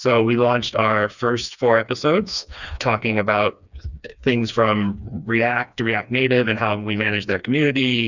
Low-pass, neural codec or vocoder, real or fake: 7.2 kHz; codec, 16 kHz, 2 kbps, FreqCodec, smaller model; fake